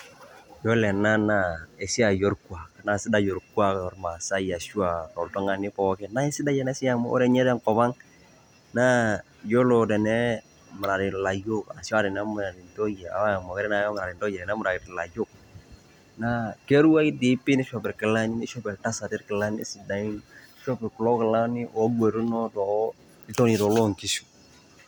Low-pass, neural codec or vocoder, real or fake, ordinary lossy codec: 19.8 kHz; none; real; none